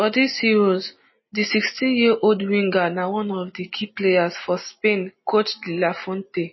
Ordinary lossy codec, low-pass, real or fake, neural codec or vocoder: MP3, 24 kbps; 7.2 kHz; real; none